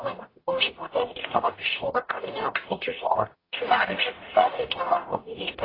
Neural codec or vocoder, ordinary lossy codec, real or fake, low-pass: codec, 44.1 kHz, 0.9 kbps, DAC; AAC, 24 kbps; fake; 5.4 kHz